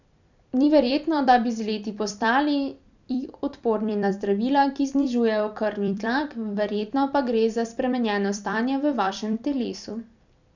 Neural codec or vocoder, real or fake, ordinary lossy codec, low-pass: vocoder, 44.1 kHz, 128 mel bands every 256 samples, BigVGAN v2; fake; none; 7.2 kHz